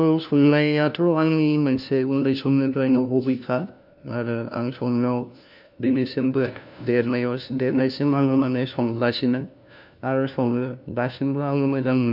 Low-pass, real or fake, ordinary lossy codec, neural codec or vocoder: 5.4 kHz; fake; none; codec, 16 kHz, 1 kbps, FunCodec, trained on LibriTTS, 50 frames a second